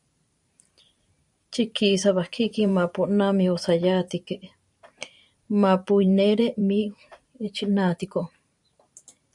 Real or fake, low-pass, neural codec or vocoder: fake; 10.8 kHz; vocoder, 44.1 kHz, 128 mel bands every 512 samples, BigVGAN v2